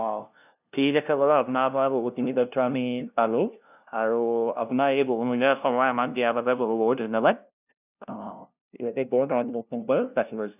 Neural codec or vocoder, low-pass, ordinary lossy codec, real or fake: codec, 16 kHz, 0.5 kbps, FunCodec, trained on LibriTTS, 25 frames a second; 3.6 kHz; none; fake